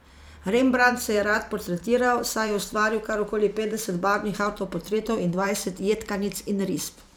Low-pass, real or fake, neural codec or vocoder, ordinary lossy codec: none; fake; vocoder, 44.1 kHz, 128 mel bands every 512 samples, BigVGAN v2; none